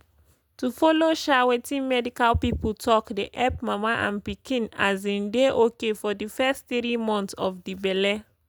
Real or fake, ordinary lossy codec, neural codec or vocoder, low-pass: real; none; none; none